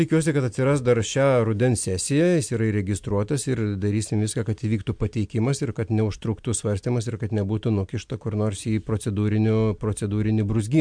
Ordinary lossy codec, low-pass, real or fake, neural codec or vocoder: MP3, 64 kbps; 9.9 kHz; real; none